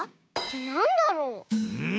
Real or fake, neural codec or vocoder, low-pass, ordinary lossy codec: fake; codec, 16 kHz, 6 kbps, DAC; none; none